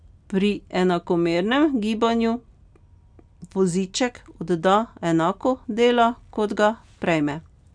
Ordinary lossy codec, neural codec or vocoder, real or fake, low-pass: none; none; real; 9.9 kHz